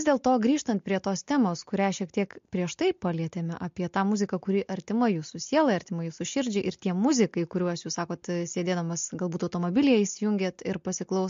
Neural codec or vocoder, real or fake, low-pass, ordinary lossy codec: none; real; 7.2 kHz; MP3, 48 kbps